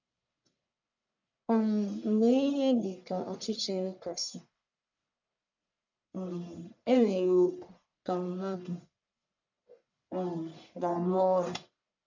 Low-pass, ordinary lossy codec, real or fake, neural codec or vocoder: 7.2 kHz; none; fake; codec, 44.1 kHz, 1.7 kbps, Pupu-Codec